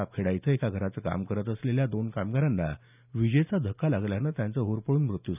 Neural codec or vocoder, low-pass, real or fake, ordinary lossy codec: none; 3.6 kHz; real; none